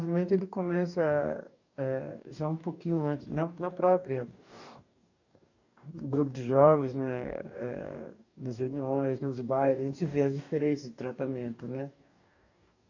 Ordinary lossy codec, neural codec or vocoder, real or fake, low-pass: none; codec, 44.1 kHz, 2.6 kbps, DAC; fake; 7.2 kHz